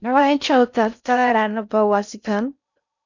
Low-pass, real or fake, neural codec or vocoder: 7.2 kHz; fake; codec, 16 kHz in and 24 kHz out, 0.6 kbps, FocalCodec, streaming, 4096 codes